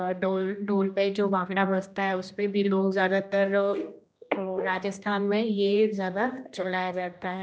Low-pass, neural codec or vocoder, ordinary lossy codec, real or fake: none; codec, 16 kHz, 1 kbps, X-Codec, HuBERT features, trained on general audio; none; fake